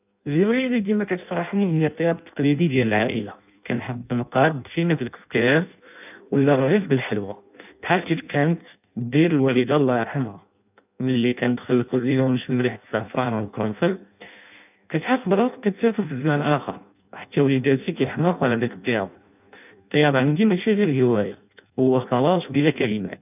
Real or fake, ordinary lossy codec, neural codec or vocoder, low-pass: fake; none; codec, 16 kHz in and 24 kHz out, 0.6 kbps, FireRedTTS-2 codec; 3.6 kHz